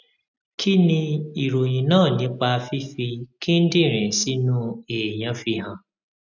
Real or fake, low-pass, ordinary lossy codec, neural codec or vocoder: real; 7.2 kHz; none; none